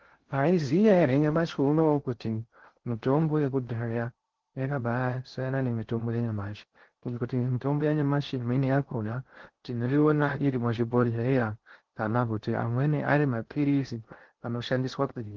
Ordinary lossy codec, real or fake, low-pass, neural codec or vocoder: Opus, 16 kbps; fake; 7.2 kHz; codec, 16 kHz in and 24 kHz out, 0.6 kbps, FocalCodec, streaming, 2048 codes